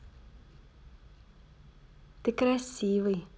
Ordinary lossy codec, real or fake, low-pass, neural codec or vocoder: none; real; none; none